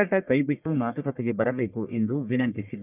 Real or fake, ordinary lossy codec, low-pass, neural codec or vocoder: fake; none; 3.6 kHz; codec, 44.1 kHz, 1.7 kbps, Pupu-Codec